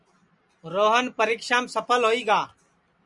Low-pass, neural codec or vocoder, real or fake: 10.8 kHz; none; real